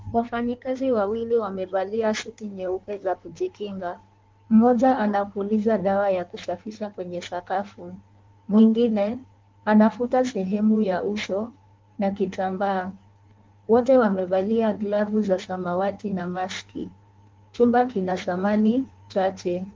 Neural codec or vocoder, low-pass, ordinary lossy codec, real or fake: codec, 16 kHz in and 24 kHz out, 1.1 kbps, FireRedTTS-2 codec; 7.2 kHz; Opus, 32 kbps; fake